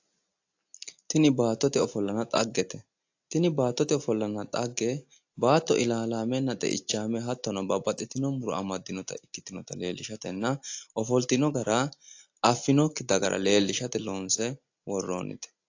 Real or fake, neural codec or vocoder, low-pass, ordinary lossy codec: real; none; 7.2 kHz; AAC, 48 kbps